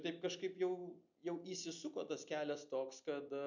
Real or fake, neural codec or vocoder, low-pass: real; none; 7.2 kHz